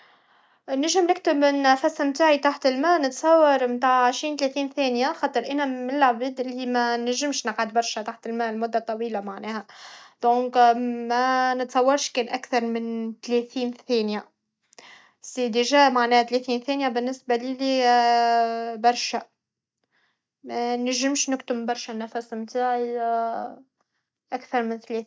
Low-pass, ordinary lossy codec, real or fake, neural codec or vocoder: none; none; real; none